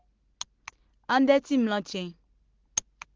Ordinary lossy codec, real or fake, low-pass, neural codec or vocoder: Opus, 16 kbps; real; 7.2 kHz; none